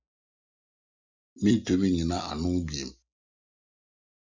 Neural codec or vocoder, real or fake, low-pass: none; real; 7.2 kHz